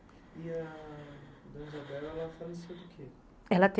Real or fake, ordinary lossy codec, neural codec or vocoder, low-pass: real; none; none; none